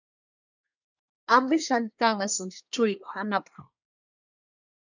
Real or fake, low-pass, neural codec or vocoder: fake; 7.2 kHz; codec, 24 kHz, 1 kbps, SNAC